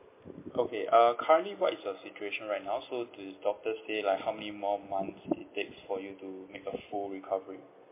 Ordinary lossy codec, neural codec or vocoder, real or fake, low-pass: MP3, 24 kbps; none; real; 3.6 kHz